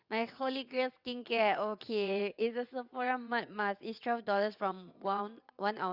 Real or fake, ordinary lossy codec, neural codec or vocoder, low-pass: fake; Opus, 64 kbps; vocoder, 22.05 kHz, 80 mel bands, WaveNeXt; 5.4 kHz